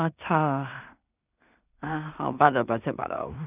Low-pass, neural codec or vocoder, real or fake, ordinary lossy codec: 3.6 kHz; codec, 16 kHz in and 24 kHz out, 0.4 kbps, LongCat-Audio-Codec, two codebook decoder; fake; none